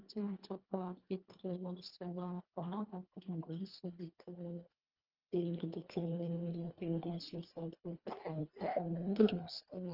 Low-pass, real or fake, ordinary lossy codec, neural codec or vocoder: 5.4 kHz; fake; Opus, 24 kbps; codec, 24 kHz, 1.5 kbps, HILCodec